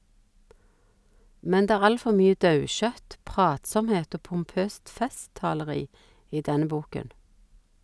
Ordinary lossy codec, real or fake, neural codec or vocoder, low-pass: none; real; none; none